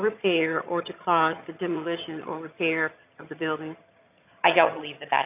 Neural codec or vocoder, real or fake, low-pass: vocoder, 22.05 kHz, 80 mel bands, HiFi-GAN; fake; 3.6 kHz